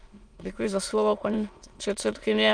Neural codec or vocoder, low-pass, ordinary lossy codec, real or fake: autoencoder, 22.05 kHz, a latent of 192 numbers a frame, VITS, trained on many speakers; 9.9 kHz; Opus, 32 kbps; fake